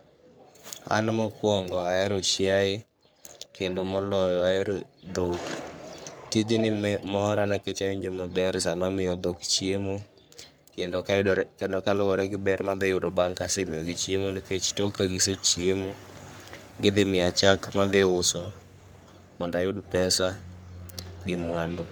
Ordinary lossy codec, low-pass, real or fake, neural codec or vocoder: none; none; fake; codec, 44.1 kHz, 3.4 kbps, Pupu-Codec